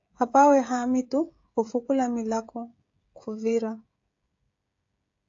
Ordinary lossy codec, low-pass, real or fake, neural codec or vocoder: AAC, 48 kbps; 7.2 kHz; fake; codec, 16 kHz, 16 kbps, FreqCodec, smaller model